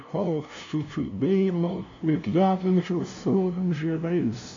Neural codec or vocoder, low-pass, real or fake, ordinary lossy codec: codec, 16 kHz, 0.5 kbps, FunCodec, trained on LibriTTS, 25 frames a second; 7.2 kHz; fake; AAC, 48 kbps